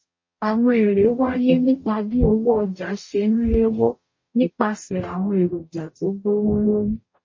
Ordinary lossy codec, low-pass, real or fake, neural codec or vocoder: MP3, 32 kbps; 7.2 kHz; fake; codec, 44.1 kHz, 0.9 kbps, DAC